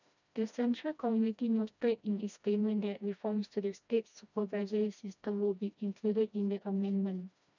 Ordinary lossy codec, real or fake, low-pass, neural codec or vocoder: none; fake; 7.2 kHz; codec, 16 kHz, 1 kbps, FreqCodec, smaller model